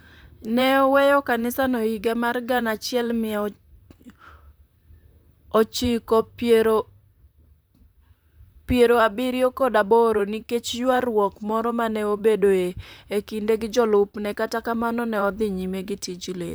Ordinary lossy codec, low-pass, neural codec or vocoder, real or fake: none; none; vocoder, 44.1 kHz, 128 mel bands, Pupu-Vocoder; fake